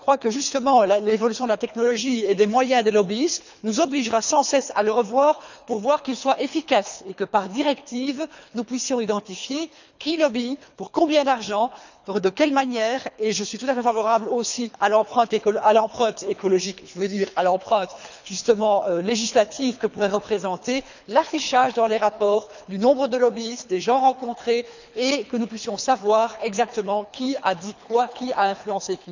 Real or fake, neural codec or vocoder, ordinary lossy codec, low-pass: fake; codec, 24 kHz, 3 kbps, HILCodec; none; 7.2 kHz